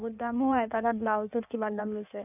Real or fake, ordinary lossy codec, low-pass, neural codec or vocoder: fake; none; 3.6 kHz; codec, 16 kHz in and 24 kHz out, 1.1 kbps, FireRedTTS-2 codec